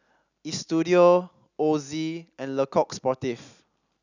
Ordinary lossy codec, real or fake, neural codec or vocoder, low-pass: none; real; none; 7.2 kHz